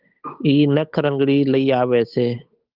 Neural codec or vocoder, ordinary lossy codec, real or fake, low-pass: codec, 16 kHz, 8 kbps, FunCodec, trained on Chinese and English, 25 frames a second; Opus, 24 kbps; fake; 5.4 kHz